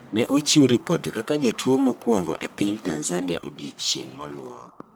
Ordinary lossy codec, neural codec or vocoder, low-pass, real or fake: none; codec, 44.1 kHz, 1.7 kbps, Pupu-Codec; none; fake